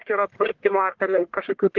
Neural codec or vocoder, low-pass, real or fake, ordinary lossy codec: codec, 44.1 kHz, 1.7 kbps, Pupu-Codec; 7.2 kHz; fake; Opus, 16 kbps